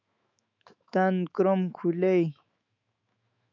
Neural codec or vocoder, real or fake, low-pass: autoencoder, 48 kHz, 128 numbers a frame, DAC-VAE, trained on Japanese speech; fake; 7.2 kHz